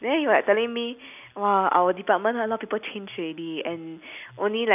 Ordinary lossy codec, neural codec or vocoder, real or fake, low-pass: none; none; real; 3.6 kHz